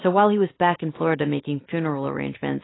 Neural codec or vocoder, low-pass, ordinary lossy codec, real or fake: none; 7.2 kHz; AAC, 16 kbps; real